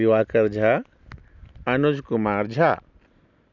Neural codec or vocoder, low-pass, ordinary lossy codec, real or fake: none; 7.2 kHz; none; real